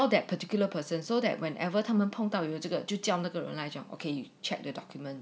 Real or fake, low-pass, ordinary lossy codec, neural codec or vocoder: real; none; none; none